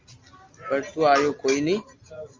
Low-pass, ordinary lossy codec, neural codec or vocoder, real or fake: 7.2 kHz; Opus, 32 kbps; none; real